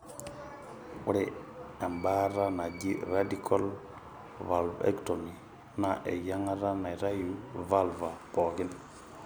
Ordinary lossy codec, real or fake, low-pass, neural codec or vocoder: none; real; none; none